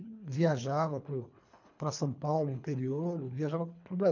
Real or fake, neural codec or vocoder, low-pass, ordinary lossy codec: fake; codec, 24 kHz, 3 kbps, HILCodec; 7.2 kHz; none